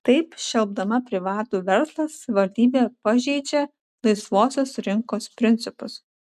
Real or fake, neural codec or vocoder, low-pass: real; none; 14.4 kHz